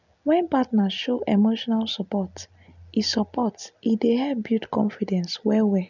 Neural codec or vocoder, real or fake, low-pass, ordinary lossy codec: none; real; 7.2 kHz; none